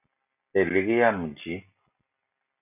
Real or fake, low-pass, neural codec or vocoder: real; 3.6 kHz; none